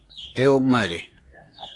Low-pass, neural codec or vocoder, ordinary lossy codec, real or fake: 10.8 kHz; codec, 24 kHz, 1 kbps, SNAC; AAC, 48 kbps; fake